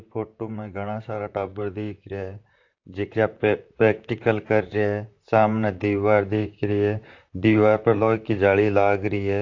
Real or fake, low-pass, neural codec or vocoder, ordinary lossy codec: fake; 7.2 kHz; vocoder, 44.1 kHz, 128 mel bands, Pupu-Vocoder; AAC, 48 kbps